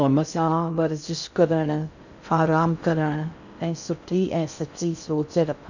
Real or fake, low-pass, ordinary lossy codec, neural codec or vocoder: fake; 7.2 kHz; none; codec, 16 kHz in and 24 kHz out, 0.6 kbps, FocalCodec, streaming, 2048 codes